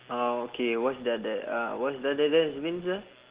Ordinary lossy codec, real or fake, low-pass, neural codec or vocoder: Opus, 16 kbps; real; 3.6 kHz; none